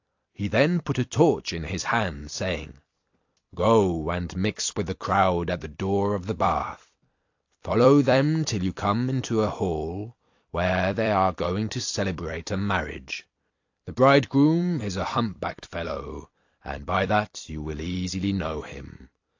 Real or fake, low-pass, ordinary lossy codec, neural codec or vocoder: fake; 7.2 kHz; AAC, 48 kbps; vocoder, 44.1 kHz, 128 mel bands every 256 samples, BigVGAN v2